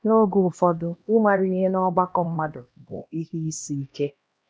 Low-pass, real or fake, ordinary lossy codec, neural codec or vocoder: none; fake; none; codec, 16 kHz, 1 kbps, X-Codec, HuBERT features, trained on LibriSpeech